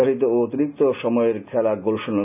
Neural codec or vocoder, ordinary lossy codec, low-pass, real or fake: none; none; 3.6 kHz; real